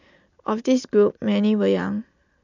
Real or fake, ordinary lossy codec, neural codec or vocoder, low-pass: real; none; none; 7.2 kHz